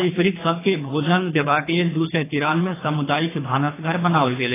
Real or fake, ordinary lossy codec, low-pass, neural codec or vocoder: fake; AAC, 16 kbps; 3.6 kHz; codec, 24 kHz, 3 kbps, HILCodec